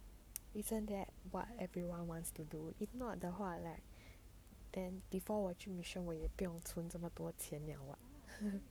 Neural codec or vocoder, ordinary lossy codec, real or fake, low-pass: codec, 44.1 kHz, 7.8 kbps, Pupu-Codec; none; fake; none